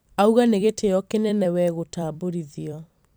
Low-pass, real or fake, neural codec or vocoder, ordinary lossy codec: none; fake; vocoder, 44.1 kHz, 128 mel bands every 256 samples, BigVGAN v2; none